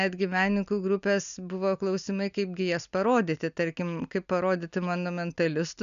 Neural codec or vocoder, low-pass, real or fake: none; 7.2 kHz; real